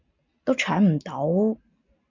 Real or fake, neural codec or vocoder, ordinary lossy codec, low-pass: real; none; MP3, 64 kbps; 7.2 kHz